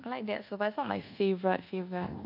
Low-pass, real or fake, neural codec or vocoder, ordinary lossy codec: 5.4 kHz; fake; codec, 24 kHz, 1.2 kbps, DualCodec; none